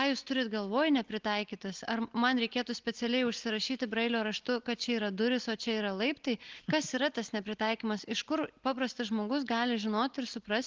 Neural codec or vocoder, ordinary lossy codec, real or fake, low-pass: none; Opus, 16 kbps; real; 7.2 kHz